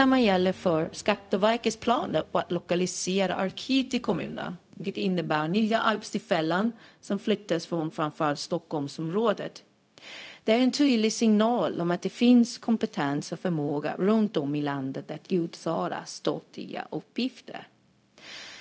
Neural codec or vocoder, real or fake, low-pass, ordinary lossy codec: codec, 16 kHz, 0.4 kbps, LongCat-Audio-Codec; fake; none; none